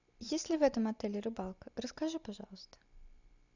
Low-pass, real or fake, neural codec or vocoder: 7.2 kHz; real; none